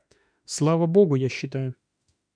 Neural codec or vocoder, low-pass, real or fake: autoencoder, 48 kHz, 32 numbers a frame, DAC-VAE, trained on Japanese speech; 9.9 kHz; fake